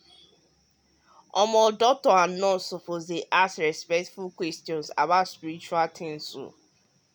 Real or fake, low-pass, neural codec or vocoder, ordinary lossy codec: real; none; none; none